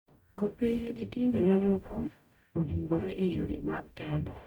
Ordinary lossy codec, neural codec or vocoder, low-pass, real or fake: none; codec, 44.1 kHz, 0.9 kbps, DAC; 19.8 kHz; fake